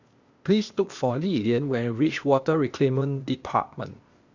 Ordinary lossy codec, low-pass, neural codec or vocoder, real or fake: Opus, 64 kbps; 7.2 kHz; codec, 16 kHz, 0.8 kbps, ZipCodec; fake